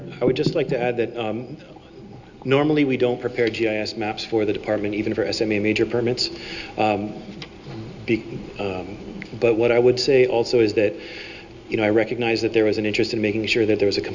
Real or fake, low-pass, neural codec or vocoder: real; 7.2 kHz; none